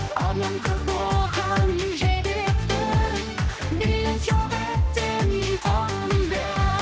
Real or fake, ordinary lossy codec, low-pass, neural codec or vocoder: fake; none; none; codec, 16 kHz, 1 kbps, X-Codec, HuBERT features, trained on general audio